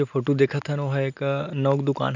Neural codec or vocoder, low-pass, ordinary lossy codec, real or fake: none; 7.2 kHz; none; real